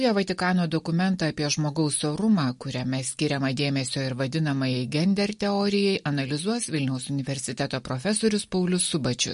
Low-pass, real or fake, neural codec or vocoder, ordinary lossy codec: 10.8 kHz; real; none; MP3, 48 kbps